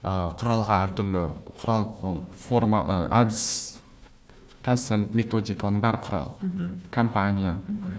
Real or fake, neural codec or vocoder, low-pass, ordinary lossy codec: fake; codec, 16 kHz, 1 kbps, FunCodec, trained on Chinese and English, 50 frames a second; none; none